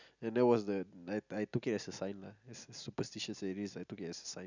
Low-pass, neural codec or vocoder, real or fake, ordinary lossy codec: 7.2 kHz; none; real; none